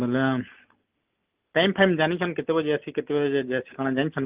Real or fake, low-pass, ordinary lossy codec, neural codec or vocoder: real; 3.6 kHz; Opus, 24 kbps; none